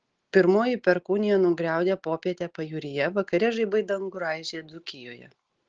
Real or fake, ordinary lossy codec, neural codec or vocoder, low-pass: real; Opus, 16 kbps; none; 7.2 kHz